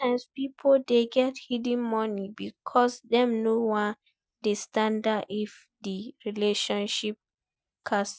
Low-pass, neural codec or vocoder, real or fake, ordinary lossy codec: none; none; real; none